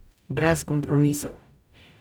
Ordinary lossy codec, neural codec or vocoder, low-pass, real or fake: none; codec, 44.1 kHz, 0.9 kbps, DAC; none; fake